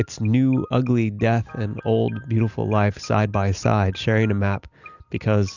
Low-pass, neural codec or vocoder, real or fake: 7.2 kHz; none; real